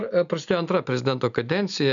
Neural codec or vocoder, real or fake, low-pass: none; real; 7.2 kHz